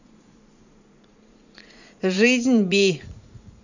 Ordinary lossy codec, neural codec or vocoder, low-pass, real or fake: none; vocoder, 44.1 kHz, 80 mel bands, Vocos; 7.2 kHz; fake